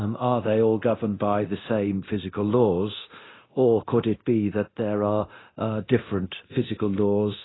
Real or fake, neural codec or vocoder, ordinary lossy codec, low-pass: fake; codec, 24 kHz, 0.9 kbps, DualCodec; AAC, 16 kbps; 7.2 kHz